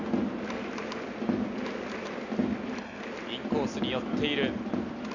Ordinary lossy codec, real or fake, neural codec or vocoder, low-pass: none; real; none; 7.2 kHz